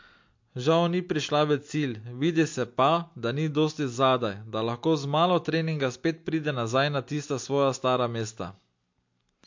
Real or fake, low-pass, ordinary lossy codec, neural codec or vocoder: real; 7.2 kHz; MP3, 48 kbps; none